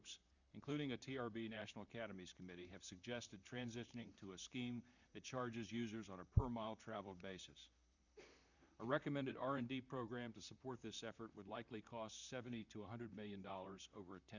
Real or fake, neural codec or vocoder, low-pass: fake; vocoder, 44.1 kHz, 128 mel bands, Pupu-Vocoder; 7.2 kHz